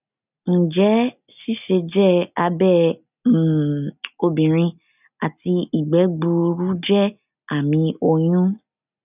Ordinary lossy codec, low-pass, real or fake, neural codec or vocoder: none; 3.6 kHz; real; none